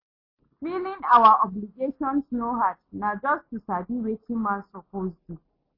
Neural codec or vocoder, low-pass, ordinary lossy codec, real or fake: none; 5.4 kHz; MP3, 24 kbps; real